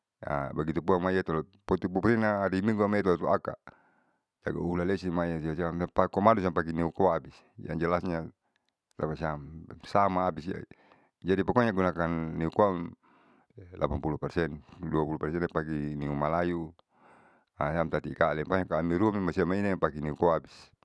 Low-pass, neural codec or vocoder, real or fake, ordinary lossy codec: none; none; real; none